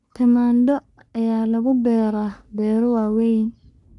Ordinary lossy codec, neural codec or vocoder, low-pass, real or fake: none; codec, 44.1 kHz, 3.4 kbps, Pupu-Codec; 10.8 kHz; fake